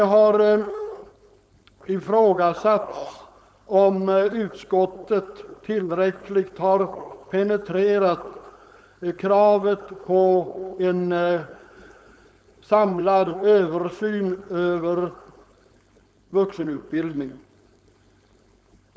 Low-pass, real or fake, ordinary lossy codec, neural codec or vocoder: none; fake; none; codec, 16 kHz, 4.8 kbps, FACodec